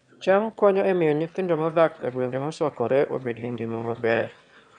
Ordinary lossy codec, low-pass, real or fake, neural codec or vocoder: none; 9.9 kHz; fake; autoencoder, 22.05 kHz, a latent of 192 numbers a frame, VITS, trained on one speaker